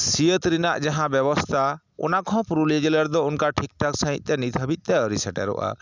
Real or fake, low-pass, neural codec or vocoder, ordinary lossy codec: real; 7.2 kHz; none; none